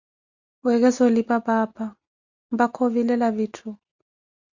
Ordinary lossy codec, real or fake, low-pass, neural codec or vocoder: Opus, 64 kbps; real; 7.2 kHz; none